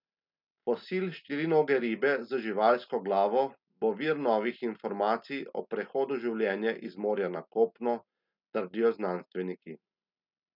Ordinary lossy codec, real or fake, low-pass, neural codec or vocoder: none; real; 5.4 kHz; none